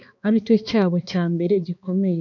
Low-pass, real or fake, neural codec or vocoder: 7.2 kHz; fake; codec, 16 kHz, 2 kbps, X-Codec, HuBERT features, trained on balanced general audio